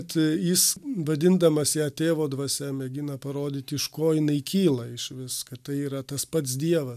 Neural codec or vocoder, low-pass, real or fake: none; 14.4 kHz; real